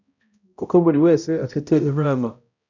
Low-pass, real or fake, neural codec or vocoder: 7.2 kHz; fake; codec, 16 kHz, 0.5 kbps, X-Codec, HuBERT features, trained on balanced general audio